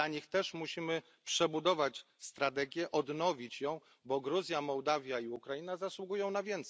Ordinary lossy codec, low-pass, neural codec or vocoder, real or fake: none; none; none; real